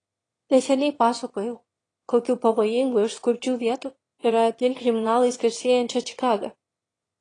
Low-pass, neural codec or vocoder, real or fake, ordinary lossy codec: 9.9 kHz; autoencoder, 22.05 kHz, a latent of 192 numbers a frame, VITS, trained on one speaker; fake; AAC, 32 kbps